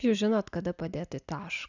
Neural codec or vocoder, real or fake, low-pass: none; real; 7.2 kHz